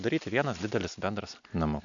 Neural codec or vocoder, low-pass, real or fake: none; 7.2 kHz; real